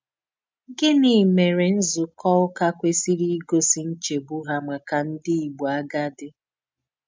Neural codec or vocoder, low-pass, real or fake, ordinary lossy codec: none; none; real; none